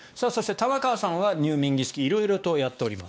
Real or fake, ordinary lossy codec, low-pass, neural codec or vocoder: fake; none; none; codec, 16 kHz, 2 kbps, X-Codec, WavLM features, trained on Multilingual LibriSpeech